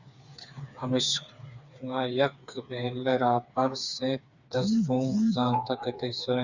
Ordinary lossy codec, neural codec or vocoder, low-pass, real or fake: Opus, 64 kbps; codec, 16 kHz, 4 kbps, FreqCodec, smaller model; 7.2 kHz; fake